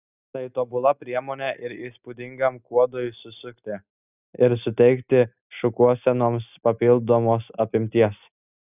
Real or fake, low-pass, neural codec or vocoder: real; 3.6 kHz; none